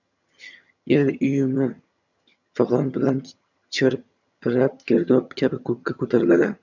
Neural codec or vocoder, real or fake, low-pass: vocoder, 22.05 kHz, 80 mel bands, HiFi-GAN; fake; 7.2 kHz